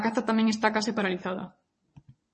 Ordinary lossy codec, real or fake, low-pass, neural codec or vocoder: MP3, 32 kbps; fake; 9.9 kHz; vocoder, 22.05 kHz, 80 mel bands, WaveNeXt